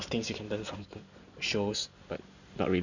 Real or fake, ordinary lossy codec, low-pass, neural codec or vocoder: real; none; 7.2 kHz; none